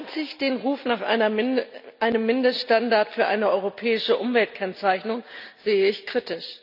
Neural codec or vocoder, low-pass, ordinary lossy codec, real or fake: none; 5.4 kHz; none; real